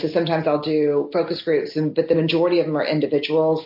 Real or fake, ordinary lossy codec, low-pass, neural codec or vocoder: real; MP3, 32 kbps; 5.4 kHz; none